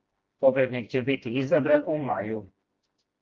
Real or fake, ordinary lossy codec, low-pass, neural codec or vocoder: fake; Opus, 32 kbps; 7.2 kHz; codec, 16 kHz, 1 kbps, FreqCodec, smaller model